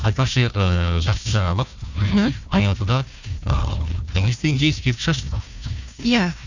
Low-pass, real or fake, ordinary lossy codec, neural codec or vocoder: 7.2 kHz; fake; none; codec, 16 kHz, 1 kbps, FunCodec, trained on Chinese and English, 50 frames a second